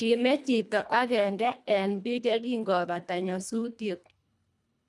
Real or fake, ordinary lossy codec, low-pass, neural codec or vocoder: fake; none; none; codec, 24 kHz, 1.5 kbps, HILCodec